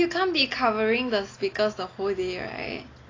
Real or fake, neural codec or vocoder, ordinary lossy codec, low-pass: real; none; AAC, 32 kbps; 7.2 kHz